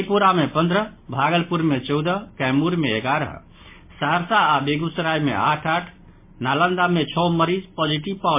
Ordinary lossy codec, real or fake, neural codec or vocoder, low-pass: MP3, 24 kbps; real; none; 3.6 kHz